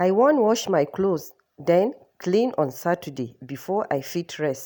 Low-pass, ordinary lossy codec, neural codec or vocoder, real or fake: none; none; none; real